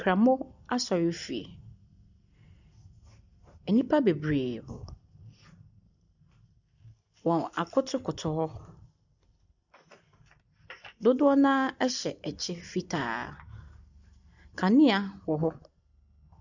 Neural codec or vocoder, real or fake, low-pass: none; real; 7.2 kHz